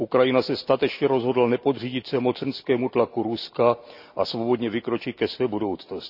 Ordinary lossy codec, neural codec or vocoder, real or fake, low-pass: none; none; real; 5.4 kHz